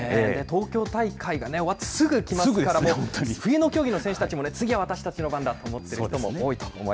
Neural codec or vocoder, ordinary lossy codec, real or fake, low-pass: none; none; real; none